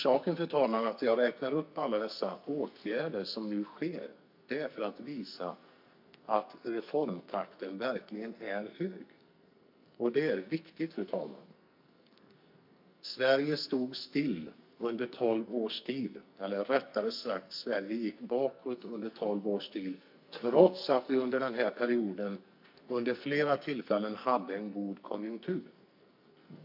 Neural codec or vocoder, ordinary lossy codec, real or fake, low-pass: codec, 44.1 kHz, 2.6 kbps, SNAC; MP3, 48 kbps; fake; 5.4 kHz